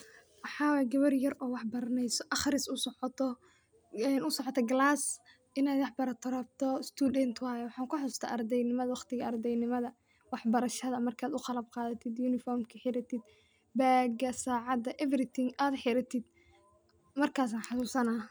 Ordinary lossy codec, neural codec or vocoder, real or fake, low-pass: none; none; real; none